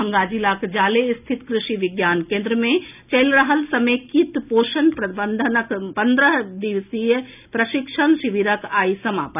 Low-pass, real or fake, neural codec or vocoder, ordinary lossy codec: 3.6 kHz; real; none; none